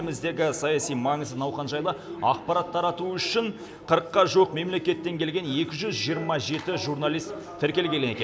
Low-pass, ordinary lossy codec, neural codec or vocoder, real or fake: none; none; none; real